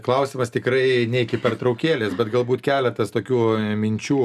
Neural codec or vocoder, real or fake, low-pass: none; real; 14.4 kHz